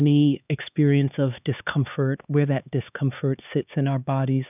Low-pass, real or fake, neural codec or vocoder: 3.6 kHz; fake; codec, 16 kHz, 4 kbps, X-Codec, HuBERT features, trained on LibriSpeech